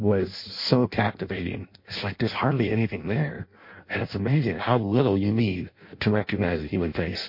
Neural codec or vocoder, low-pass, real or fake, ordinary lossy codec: codec, 16 kHz in and 24 kHz out, 0.6 kbps, FireRedTTS-2 codec; 5.4 kHz; fake; MP3, 32 kbps